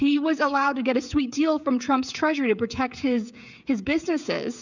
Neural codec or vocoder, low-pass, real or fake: codec, 16 kHz, 16 kbps, FreqCodec, smaller model; 7.2 kHz; fake